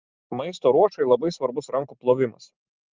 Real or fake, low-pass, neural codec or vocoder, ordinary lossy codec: fake; 7.2 kHz; vocoder, 44.1 kHz, 128 mel bands every 512 samples, BigVGAN v2; Opus, 24 kbps